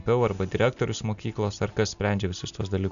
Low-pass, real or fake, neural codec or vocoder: 7.2 kHz; real; none